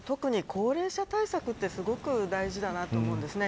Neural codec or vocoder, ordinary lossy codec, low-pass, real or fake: none; none; none; real